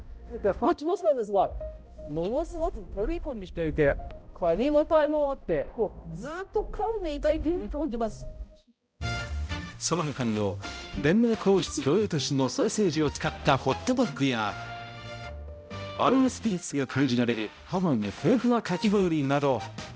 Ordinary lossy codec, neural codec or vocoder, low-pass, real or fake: none; codec, 16 kHz, 0.5 kbps, X-Codec, HuBERT features, trained on balanced general audio; none; fake